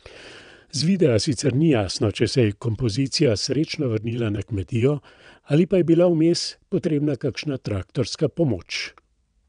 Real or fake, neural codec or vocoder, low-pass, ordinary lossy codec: fake; vocoder, 22.05 kHz, 80 mel bands, WaveNeXt; 9.9 kHz; none